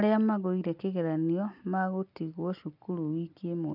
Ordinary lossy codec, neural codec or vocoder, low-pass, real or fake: none; none; 5.4 kHz; real